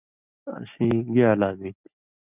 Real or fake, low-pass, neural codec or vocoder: real; 3.6 kHz; none